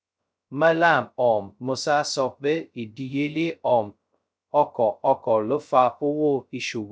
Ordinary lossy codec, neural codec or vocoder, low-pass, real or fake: none; codec, 16 kHz, 0.2 kbps, FocalCodec; none; fake